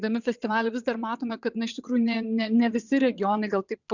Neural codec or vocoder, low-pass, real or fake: vocoder, 22.05 kHz, 80 mel bands, WaveNeXt; 7.2 kHz; fake